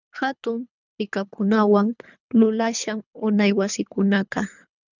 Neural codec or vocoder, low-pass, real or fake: codec, 24 kHz, 3 kbps, HILCodec; 7.2 kHz; fake